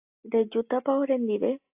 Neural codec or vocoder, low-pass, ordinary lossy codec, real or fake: none; 3.6 kHz; AAC, 32 kbps; real